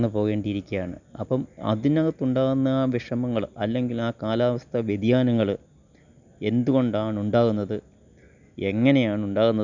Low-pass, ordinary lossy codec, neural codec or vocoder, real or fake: 7.2 kHz; none; none; real